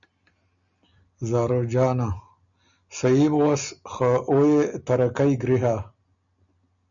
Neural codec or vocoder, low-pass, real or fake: none; 7.2 kHz; real